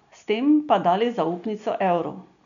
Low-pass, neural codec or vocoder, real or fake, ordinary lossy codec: 7.2 kHz; none; real; none